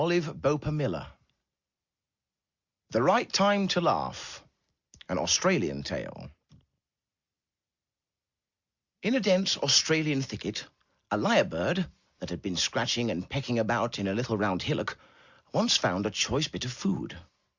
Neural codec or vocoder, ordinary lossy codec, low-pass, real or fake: none; Opus, 64 kbps; 7.2 kHz; real